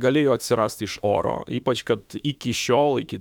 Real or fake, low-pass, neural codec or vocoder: fake; 19.8 kHz; autoencoder, 48 kHz, 32 numbers a frame, DAC-VAE, trained on Japanese speech